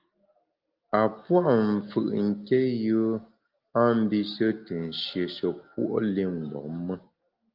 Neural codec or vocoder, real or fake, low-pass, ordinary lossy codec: none; real; 5.4 kHz; Opus, 24 kbps